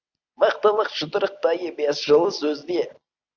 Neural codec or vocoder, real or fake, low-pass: none; real; 7.2 kHz